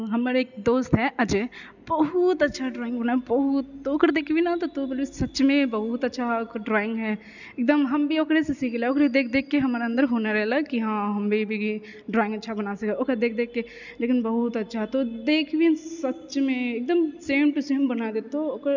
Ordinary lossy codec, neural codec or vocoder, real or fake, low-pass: none; none; real; 7.2 kHz